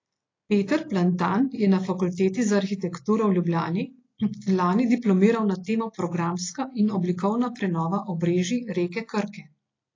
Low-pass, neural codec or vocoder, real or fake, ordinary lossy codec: 7.2 kHz; none; real; AAC, 32 kbps